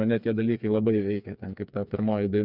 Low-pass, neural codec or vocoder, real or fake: 5.4 kHz; codec, 16 kHz, 4 kbps, FreqCodec, smaller model; fake